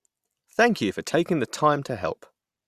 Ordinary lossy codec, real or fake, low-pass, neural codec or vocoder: Opus, 64 kbps; real; 14.4 kHz; none